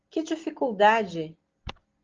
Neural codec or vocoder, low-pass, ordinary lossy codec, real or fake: none; 7.2 kHz; Opus, 16 kbps; real